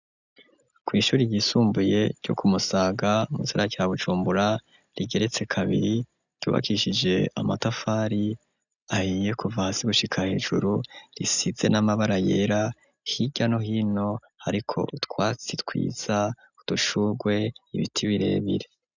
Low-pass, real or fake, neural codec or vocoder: 7.2 kHz; real; none